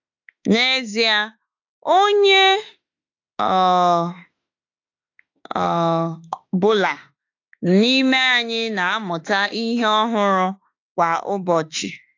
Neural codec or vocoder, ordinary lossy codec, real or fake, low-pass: autoencoder, 48 kHz, 32 numbers a frame, DAC-VAE, trained on Japanese speech; AAC, 48 kbps; fake; 7.2 kHz